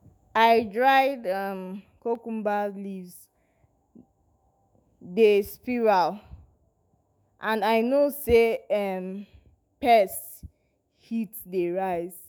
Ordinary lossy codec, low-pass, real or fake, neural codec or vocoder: none; none; fake; autoencoder, 48 kHz, 128 numbers a frame, DAC-VAE, trained on Japanese speech